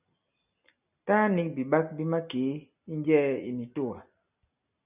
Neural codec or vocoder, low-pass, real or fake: none; 3.6 kHz; real